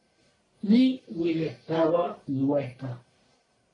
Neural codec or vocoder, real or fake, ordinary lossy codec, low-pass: codec, 44.1 kHz, 1.7 kbps, Pupu-Codec; fake; AAC, 32 kbps; 10.8 kHz